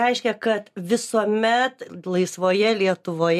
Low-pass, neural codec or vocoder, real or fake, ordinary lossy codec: 14.4 kHz; none; real; MP3, 96 kbps